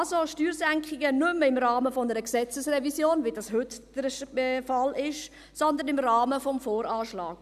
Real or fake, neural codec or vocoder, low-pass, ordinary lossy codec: real; none; 14.4 kHz; none